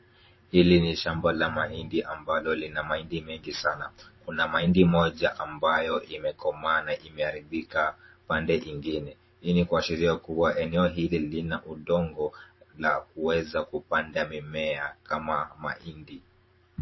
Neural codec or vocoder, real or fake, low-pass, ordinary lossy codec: vocoder, 44.1 kHz, 128 mel bands every 512 samples, BigVGAN v2; fake; 7.2 kHz; MP3, 24 kbps